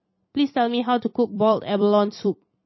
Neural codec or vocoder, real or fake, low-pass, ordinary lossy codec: vocoder, 44.1 kHz, 128 mel bands every 256 samples, BigVGAN v2; fake; 7.2 kHz; MP3, 24 kbps